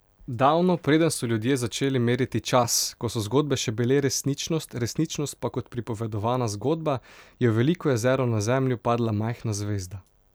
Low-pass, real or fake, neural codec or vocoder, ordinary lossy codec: none; real; none; none